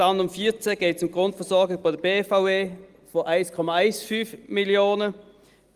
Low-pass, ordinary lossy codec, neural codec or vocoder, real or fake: 14.4 kHz; Opus, 32 kbps; none; real